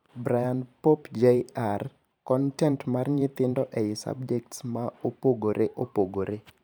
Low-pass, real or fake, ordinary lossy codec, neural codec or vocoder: none; fake; none; vocoder, 44.1 kHz, 128 mel bands every 512 samples, BigVGAN v2